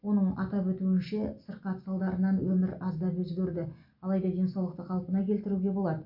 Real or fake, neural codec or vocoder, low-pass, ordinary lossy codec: real; none; 5.4 kHz; MP3, 48 kbps